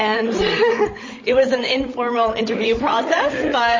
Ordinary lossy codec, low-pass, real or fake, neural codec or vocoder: MP3, 32 kbps; 7.2 kHz; fake; codec, 16 kHz, 16 kbps, FreqCodec, larger model